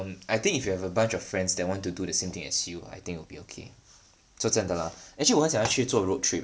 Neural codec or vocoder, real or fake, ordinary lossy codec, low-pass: none; real; none; none